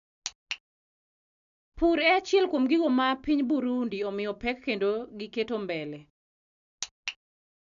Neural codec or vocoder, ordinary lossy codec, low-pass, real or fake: none; none; 7.2 kHz; real